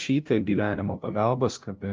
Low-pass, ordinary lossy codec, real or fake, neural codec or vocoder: 7.2 kHz; Opus, 32 kbps; fake; codec, 16 kHz, 0.5 kbps, FunCodec, trained on LibriTTS, 25 frames a second